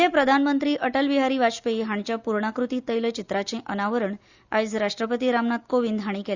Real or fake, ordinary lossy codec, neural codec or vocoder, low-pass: real; Opus, 64 kbps; none; 7.2 kHz